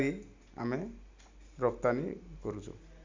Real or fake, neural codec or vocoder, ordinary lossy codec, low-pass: real; none; MP3, 64 kbps; 7.2 kHz